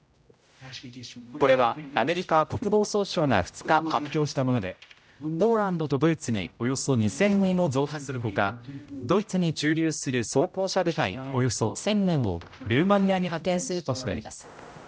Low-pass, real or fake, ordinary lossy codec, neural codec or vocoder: none; fake; none; codec, 16 kHz, 0.5 kbps, X-Codec, HuBERT features, trained on general audio